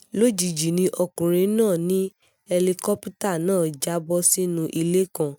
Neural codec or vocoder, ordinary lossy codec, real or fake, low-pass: none; none; real; none